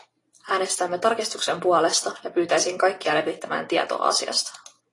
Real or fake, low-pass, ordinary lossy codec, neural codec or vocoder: real; 10.8 kHz; AAC, 32 kbps; none